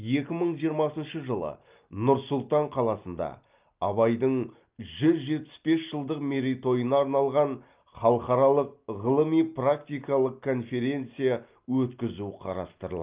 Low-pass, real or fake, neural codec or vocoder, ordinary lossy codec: 3.6 kHz; real; none; Opus, 24 kbps